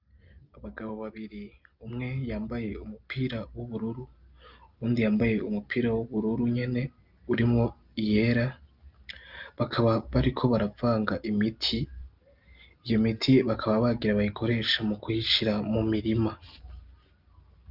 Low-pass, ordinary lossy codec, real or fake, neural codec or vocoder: 5.4 kHz; Opus, 24 kbps; real; none